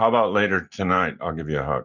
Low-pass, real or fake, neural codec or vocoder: 7.2 kHz; real; none